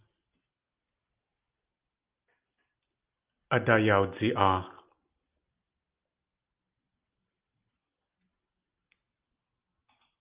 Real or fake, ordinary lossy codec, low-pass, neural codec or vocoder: real; Opus, 32 kbps; 3.6 kHz; none